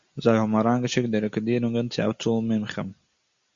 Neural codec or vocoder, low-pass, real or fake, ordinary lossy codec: none; 7.2 kHz; real; Opus, 64 kbps